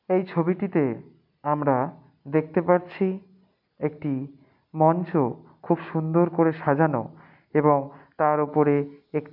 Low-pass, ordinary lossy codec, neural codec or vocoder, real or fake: 5.4 kHz; none; none; real